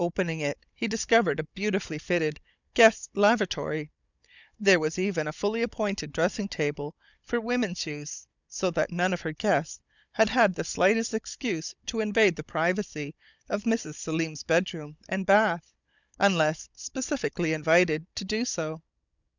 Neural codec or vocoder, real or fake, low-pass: codec, 16 kHz, 16 kbps, FunCodec, trained on Chinese and English, 50 frames a second; fake; 7.2 kHz